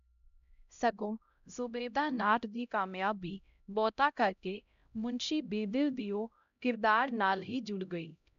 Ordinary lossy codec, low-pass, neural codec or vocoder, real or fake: none; 7.2 kHz; codec, 16 kHz, 0.5 kbps, X-Codec, HuBERT features, trained on LibriSpeech; fake